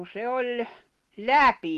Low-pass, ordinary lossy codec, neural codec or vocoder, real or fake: 14.4 kHz; Opus, 16 kbps; none; real